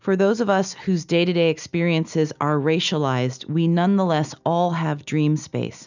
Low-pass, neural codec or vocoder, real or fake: 7.2 kHz; none; real